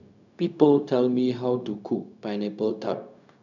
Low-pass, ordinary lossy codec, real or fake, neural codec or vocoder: 7.2 kHz; none; fake; codec, 16 kHz, 0.4 kbps, LongCat-Audio-Codec